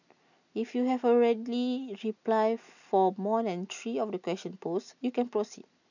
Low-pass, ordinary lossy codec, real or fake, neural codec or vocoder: 7.2 kHz; none; real; none